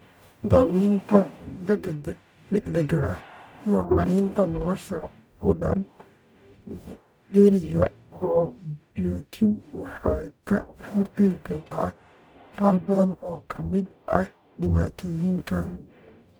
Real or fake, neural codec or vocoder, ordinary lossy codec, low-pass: fake; codec, 44.1 kHz, 0.9 kbps, DAC; none; none